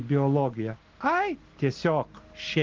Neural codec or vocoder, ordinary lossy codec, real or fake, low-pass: none; Opus, 16 kbps; real; 7.2 kHz